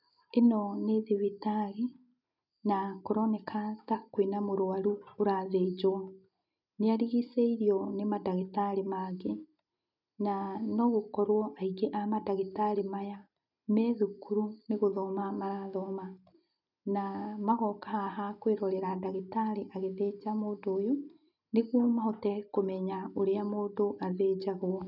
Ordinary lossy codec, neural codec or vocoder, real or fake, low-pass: none; none; real; 5.4 kHz